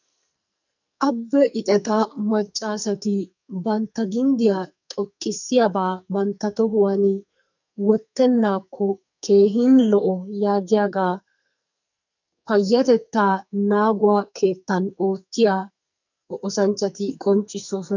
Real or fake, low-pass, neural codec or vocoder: fake; 7.2 kHz; codec, 44.1 kHz, 2.6 kbps, SNAC